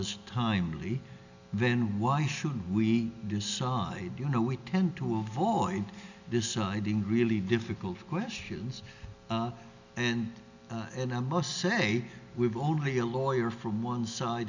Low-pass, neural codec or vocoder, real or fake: 7.2 kHz; none; real